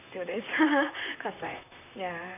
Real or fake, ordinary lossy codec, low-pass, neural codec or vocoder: fake; none; 3.6 kHz; vocoder, 44.1 kHz, 128 mel bands, Pupu-Vocoder